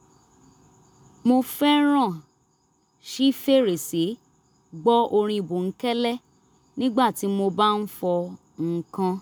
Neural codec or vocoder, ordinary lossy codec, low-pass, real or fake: none; none; none; real